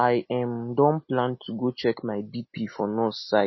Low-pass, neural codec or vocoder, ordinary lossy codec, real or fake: 7.2 kHz; none; MP3, 24 kbps; real